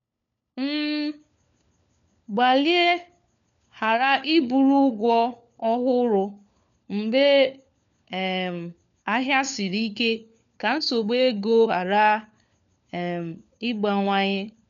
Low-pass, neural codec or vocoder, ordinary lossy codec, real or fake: 7.2 kHz; codec, 16 kHz, 4 kbps, FunCodec, trained on LibriTTS, 50 frames a second; none; fake